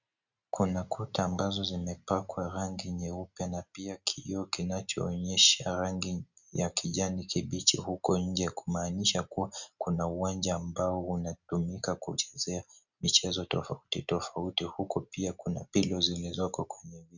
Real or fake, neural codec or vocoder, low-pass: real; none; 7.2 kHz